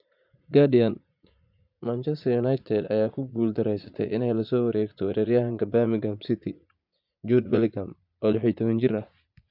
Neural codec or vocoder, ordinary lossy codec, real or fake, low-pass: vocoder, 44.1 kHz, 80 mel bands, Vocos; MP3, 48 kbps; fake; 5.4 kHz